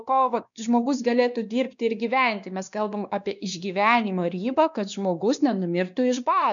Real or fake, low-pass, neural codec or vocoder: fake; 7.2 kHz; codec, 16 kHz, 2 kbps, X-Codec, WavLM features, trained on Multilingual LibriSpeech